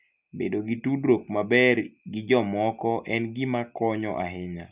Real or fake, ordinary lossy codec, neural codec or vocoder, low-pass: real; Opus, 64 kbps; none; 3.6 kHz